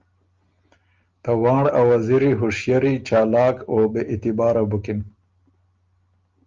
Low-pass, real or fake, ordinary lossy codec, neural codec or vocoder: 7.2 kHz; real; Opus, 32 kbps; none